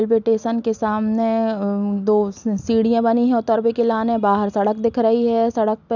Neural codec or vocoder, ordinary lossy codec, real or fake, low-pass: none; none; real; 7.2 kHz